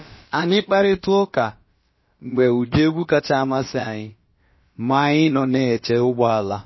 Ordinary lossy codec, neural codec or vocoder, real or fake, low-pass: MP3, 24 kbps; codec, 16 kHz, about 1 kbps, DyCAST, with the encoder's durations; fake; 7.2 kHz